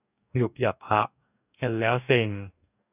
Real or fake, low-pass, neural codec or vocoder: fake; 3.6 kHz; codec, 44.1 kHz, 2.6 kbps, DAC